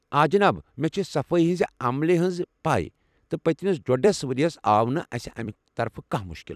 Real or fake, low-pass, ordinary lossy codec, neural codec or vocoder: fake; 14.4 kHz; none; vocoder, 44.1 kHz, 128 mel bands every 256 samples, BigVGAN v2